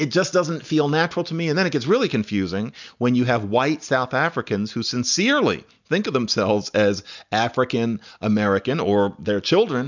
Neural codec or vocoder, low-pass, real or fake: none; 7.2 kHz; real